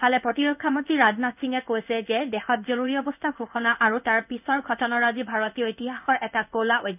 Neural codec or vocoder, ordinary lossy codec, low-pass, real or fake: codec, 16 kHz in and 24 kHz out, 1 kbps, XY-Tokenizer; none; 3.6 kHz; fake